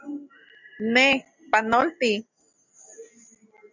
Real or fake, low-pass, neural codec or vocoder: real; 7.2 kHz; none